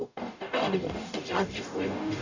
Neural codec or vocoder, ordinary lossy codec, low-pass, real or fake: codec, 44.1 kHz, 0.9 kbps, DAC; none; 7.2 kHz; fake